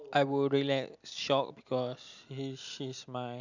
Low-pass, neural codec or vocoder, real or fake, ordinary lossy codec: 7.2 kHz; none; real; none